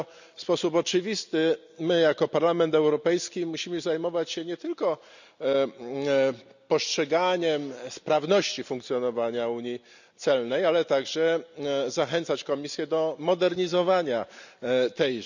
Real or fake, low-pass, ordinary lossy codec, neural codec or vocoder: real; 7.2 kHz; none; none